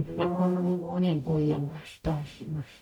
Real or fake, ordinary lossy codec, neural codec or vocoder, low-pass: fake; none; codec, 44.1 kHz, 0.9 kbps, DAC; 19.8 kHz